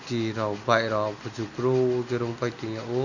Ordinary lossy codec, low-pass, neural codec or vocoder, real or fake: none; 7.2 kHz; none; real